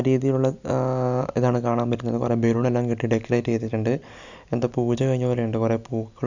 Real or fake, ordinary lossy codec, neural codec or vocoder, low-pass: real; none; none; 7.2 kHz